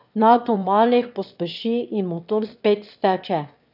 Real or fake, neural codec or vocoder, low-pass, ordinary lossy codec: fake; autoencoder, 22.05 kHz, a latent of 192 numbers a frame, VITS, trained on one speaker; 5.4 kHz; none